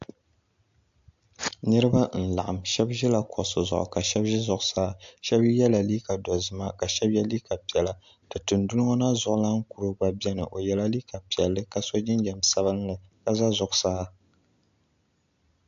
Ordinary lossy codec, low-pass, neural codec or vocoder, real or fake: AAC, 64 kbps; 7.2 kHz; none; real